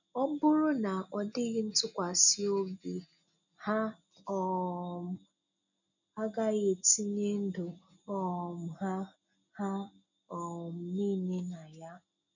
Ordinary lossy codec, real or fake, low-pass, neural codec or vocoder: none; real; 7.2 kHz; none